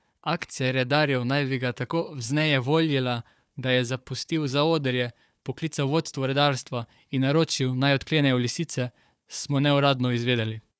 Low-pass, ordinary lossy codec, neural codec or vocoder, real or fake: none; none; codec, 16 kHz, 4 kbps, FunCodec, trained on Chinese and English, 50 frames a second; fake